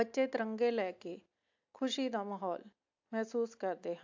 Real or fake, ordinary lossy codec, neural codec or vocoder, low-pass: real; AAC, 48 kbps; none; 7.2 kHz